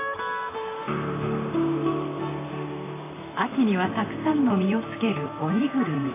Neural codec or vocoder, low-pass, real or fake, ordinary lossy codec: vocoder, 44.1 kHz, 128 mel bands, Pupu-Vocoder; 3.6 kHz; fake; AAC, 16 kbps